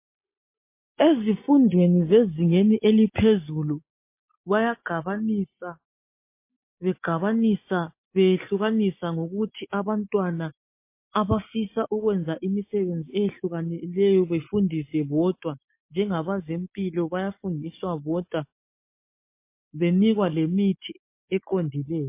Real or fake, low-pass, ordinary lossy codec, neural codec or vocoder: real; 3.6 kHz; MP3, 24 kbps; none